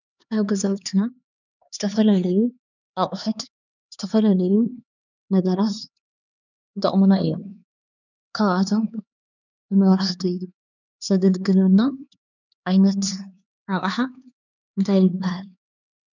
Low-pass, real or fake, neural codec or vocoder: 7.2 kHz; fake; codec, 16 kHz, 4 kbps, X-Codec, HuBERT features, trained on LibriSpeech